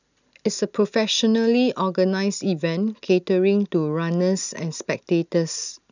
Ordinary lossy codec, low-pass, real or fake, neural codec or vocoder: none; 7.2 kHz; real; none